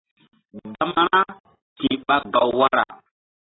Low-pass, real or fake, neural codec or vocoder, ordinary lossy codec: 7.2 kHz; real; none; AAC, 16 kbps